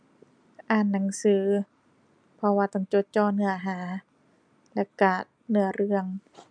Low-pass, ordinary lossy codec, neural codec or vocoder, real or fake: 9.9 kHz; none; none; real